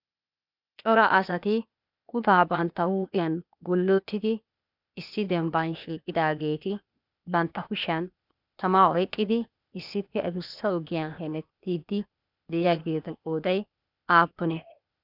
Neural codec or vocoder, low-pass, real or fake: codec, 16 kHz, 0.8 kbps, ZipCodec; 5.4 kHz; fake